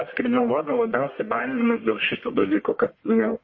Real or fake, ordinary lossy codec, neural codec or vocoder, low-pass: fake; MP3, 32 kbps; codec, 44.1 kHz, 1.7 kbps, Pupu-Codec; 7.2 kHz